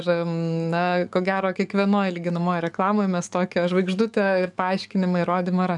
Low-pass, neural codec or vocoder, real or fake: 10.8 kHz; codec, 44.1 kHz, 7.8 kbps, DAC; fake